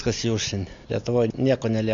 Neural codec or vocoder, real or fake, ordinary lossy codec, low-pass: none; real; AAC, 64 kbps; 7.2 kHz